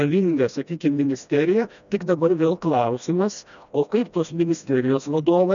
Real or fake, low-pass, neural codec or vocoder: fake; 7.2 kHz; codec, 16 kHz, 1 kbps, FreqCodec, smaller model